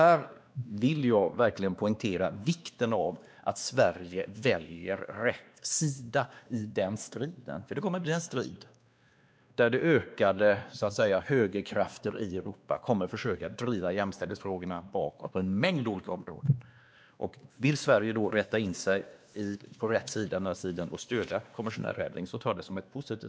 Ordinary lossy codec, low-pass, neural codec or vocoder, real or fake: none; none; codec, 16 kHz, 2 kbps, X-Codec, WavLM features, trained on Multilingual LibriSpeech; fake